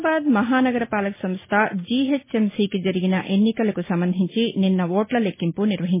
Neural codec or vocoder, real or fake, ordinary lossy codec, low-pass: none; real; MP3, 16 kbps; 3.6 kHz